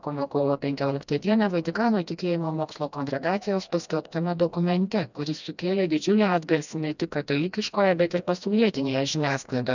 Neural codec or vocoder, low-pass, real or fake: codec, 16 kHz, 1 kbps, FreqCodec, smaller model; 7.2 kHz; fake